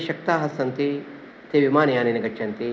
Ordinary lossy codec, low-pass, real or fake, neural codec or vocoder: none; none; real; none